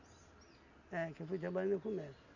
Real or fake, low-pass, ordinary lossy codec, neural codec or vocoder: real; 7.2 kHz; none; none